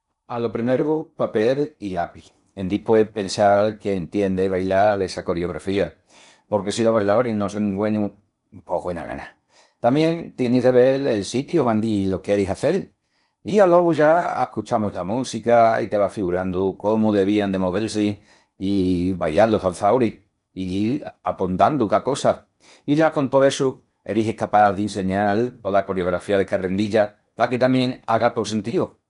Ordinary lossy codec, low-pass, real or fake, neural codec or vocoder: none; 10.8 kHz; fake; codec, 16 kHz in and 24 kHz out, 0.8 kbps, FocalCodec, streaming, 65536 codes